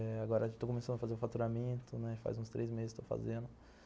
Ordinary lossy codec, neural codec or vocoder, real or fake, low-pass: none; none; real; none